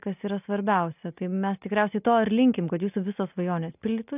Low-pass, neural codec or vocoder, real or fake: 3.6 kHz; none; real